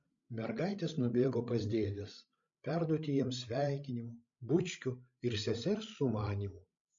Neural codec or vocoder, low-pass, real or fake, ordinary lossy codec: codec, 16 kHz, 16 kbps, FreqCodec, larger model; 7.2 kHz; fake; MP3, 48 kbps